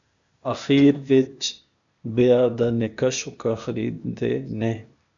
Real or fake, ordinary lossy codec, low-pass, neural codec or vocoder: fake; MP3, 96 kbps; 7.2 kHz; codec, 16 kHz, 0.8 kbps, ZipCodec